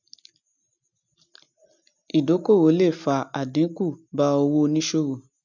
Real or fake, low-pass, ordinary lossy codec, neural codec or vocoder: real; 7.2 kHz; none; none